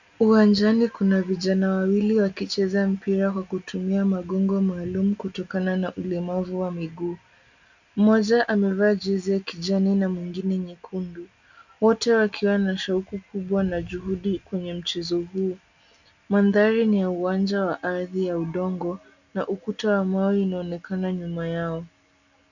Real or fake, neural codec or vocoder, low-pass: real; none; 7.2 kHz